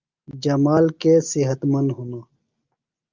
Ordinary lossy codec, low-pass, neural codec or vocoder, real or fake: Opus, 24 kbps; 7.2 kHz; none; real